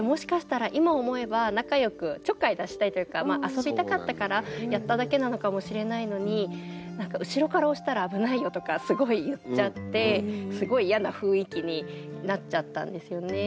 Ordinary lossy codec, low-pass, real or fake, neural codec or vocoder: none; none; real; none